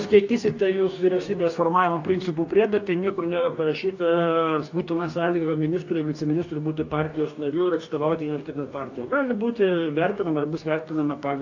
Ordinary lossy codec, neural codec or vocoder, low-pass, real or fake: AAC, 48 kbps; codec, 44.1 kHz, 2.6 kbps, DAC; 7.2 kHz; fake